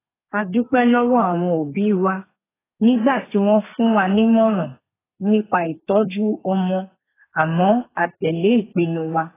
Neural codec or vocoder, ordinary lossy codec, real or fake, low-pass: codec, 32 kHz, 1.9 kbps, SNAC; AAC, 16 kbps; fake; 3.6 kHz